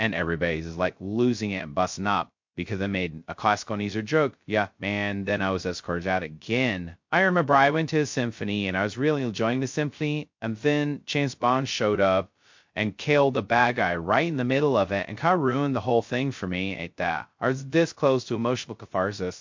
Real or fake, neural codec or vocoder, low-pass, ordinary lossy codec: fake; codec, 16 kHz, 0.2 kbps, FocalCodec; 7.2 kHz; MP3, 48 kbps